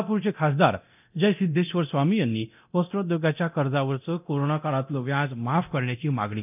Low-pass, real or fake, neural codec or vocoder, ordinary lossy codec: 3.6 kHz; fake; codec, 24 kHz, 0.9 kbps, DualCodec; none